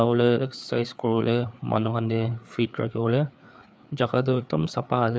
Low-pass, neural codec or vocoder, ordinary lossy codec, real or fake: none; codec, 16 kHz, 4 kbps, FreqCodec, larger model; none; fake